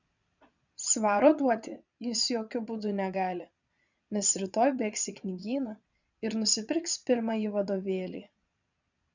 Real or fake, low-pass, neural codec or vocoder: fake; 7.2 kHz; vocoder, 22.05 kHz, 80 mel bands, Vocos